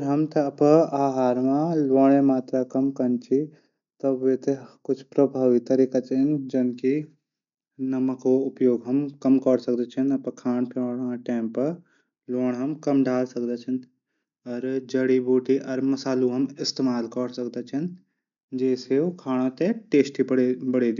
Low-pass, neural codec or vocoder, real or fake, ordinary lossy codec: 7.2 kHz; none; real; none